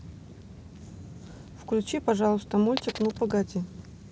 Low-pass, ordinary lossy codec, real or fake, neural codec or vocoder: none; none; real; none